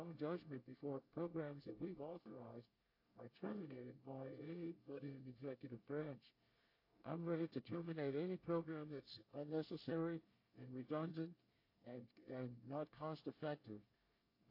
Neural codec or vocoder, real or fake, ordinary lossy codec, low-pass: codec, 24 kHz, 1 kbps, SNAC; fake; AAC, 32 kbps; 5.4 kHz